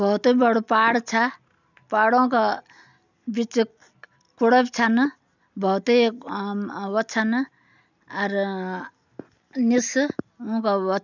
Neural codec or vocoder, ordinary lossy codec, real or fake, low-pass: none; none; real; 7.2 kHz